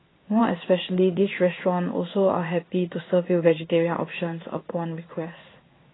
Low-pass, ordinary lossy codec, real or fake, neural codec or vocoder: 7.2 kHz; AAC, 16 kbps; fake; codec, 16 kHz in and 24 kHz out, 1 kbps, XY-Tokenizer